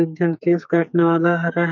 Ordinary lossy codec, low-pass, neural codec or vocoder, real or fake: none; 7.2 kHz; codec, 44.1 kHz, 2.6 kbps, SNAC; fake